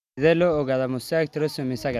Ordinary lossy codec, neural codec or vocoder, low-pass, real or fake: none; none; 14.4 kHz; real